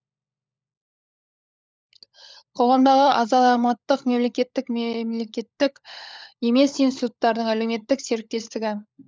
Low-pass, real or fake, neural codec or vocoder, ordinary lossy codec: none; fake; codec, 16 kHz, 16 kbps, FunCodec, trained on LibriTTS, 50 frames a second; none